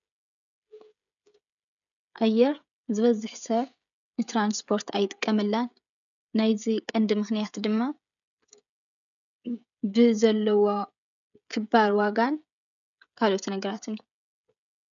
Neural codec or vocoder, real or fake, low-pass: codec, 16 kHz, 16 kbps, FreqCodec, smaller model; fake; 7.2 kHz